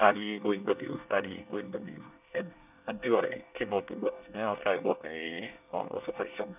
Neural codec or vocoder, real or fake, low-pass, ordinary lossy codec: codec, 24 kHz, 1 kbps, SNAC; fake; 3.6 kHz; none